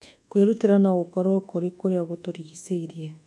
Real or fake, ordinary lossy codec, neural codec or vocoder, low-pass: fake; none; codec, 24 kHz, 1.2 kbps, DualCodec; none